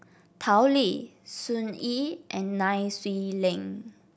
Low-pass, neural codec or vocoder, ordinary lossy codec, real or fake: none; none; none; real